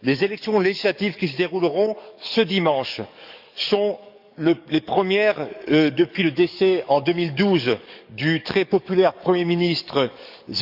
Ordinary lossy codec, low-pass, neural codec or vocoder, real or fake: none; 5.4 kHz; codec, 44.1 kHz, 7.8 kbps, DAC; fake